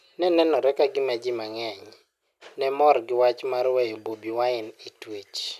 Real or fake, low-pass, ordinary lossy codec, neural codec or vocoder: real; 14.4 kHz; none; none